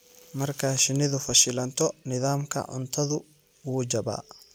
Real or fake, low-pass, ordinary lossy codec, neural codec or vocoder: real; none; none; none